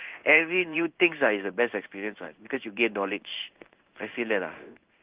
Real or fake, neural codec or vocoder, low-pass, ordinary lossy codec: fake; codec, 16 kHz in and 24 kHz out, 1 kbps, XY-Tokenizer; 3.6 kHz; Opus, 24 kbps